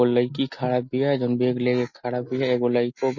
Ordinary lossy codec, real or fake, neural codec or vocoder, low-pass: MP3, 32 kbps; real; none; 7.2 kHz